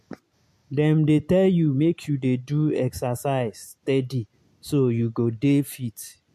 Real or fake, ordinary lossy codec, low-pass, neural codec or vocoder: real; MP3, 64 kbps; 14.4 kHz; none